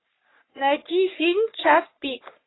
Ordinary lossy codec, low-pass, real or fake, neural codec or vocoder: AAC, 16 kbps; 7.2 kHz; fake; codec, 44.1 kHz, 3.4 kbps, Pupu-Codec